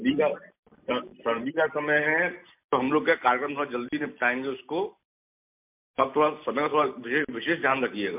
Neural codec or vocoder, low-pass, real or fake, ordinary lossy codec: none; 3.6 kHz; real; MP3, 32 kbps